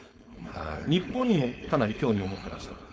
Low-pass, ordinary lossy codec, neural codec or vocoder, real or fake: none; none; codec, 16 kHz, 4.8 kbps, FACodec; fake